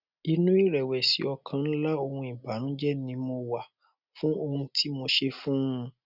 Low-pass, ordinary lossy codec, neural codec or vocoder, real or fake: 5.4 kHz; none; none; real